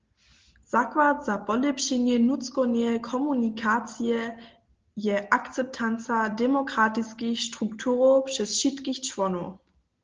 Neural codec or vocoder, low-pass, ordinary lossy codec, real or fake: none; 7.2 kHz; Opus, 16 kbps; real